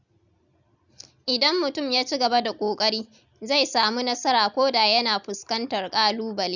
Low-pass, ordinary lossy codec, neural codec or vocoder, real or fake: 7.2 kHz; none; none; real